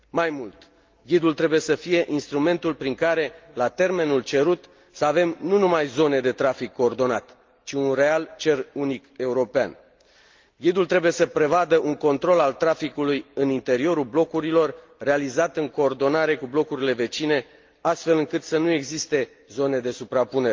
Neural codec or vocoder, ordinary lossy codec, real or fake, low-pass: none; Opus, 32 kbps; real; 7.2 kHz